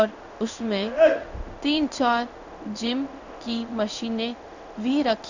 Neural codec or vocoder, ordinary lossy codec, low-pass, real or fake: codec, 16 kHz in and 24 kHz out, 1 kbps, XY-Tokenizer; none; 7.2 kHz; fake